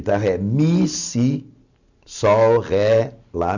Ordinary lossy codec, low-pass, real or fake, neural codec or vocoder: none; 7.2 kHz; real; none